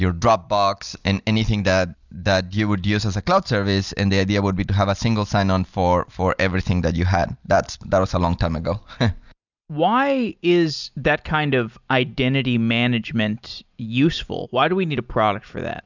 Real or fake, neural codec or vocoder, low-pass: real; none; 7.2 kHz